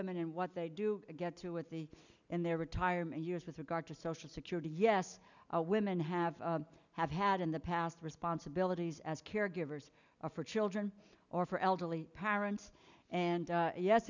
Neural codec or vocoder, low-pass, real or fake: none; 7.2 kHz; real